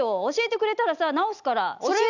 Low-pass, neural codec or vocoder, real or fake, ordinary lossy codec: 7.2 kHz; none; real; none